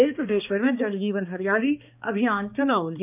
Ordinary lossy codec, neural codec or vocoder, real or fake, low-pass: none; codec, 16 kHz, 2 kbps, X-Codec, HuBERT features, trained on balanced general audio; fake; 3.6 kHz